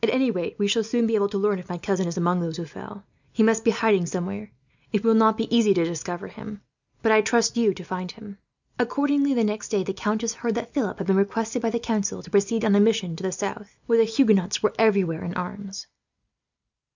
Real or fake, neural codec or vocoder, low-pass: real; none; 7.2 kHz